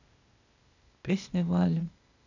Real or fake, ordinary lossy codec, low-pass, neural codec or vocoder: fake; none; 7.2 kHz; codec, 16 kHz, 0.8 kbps, ZipCodec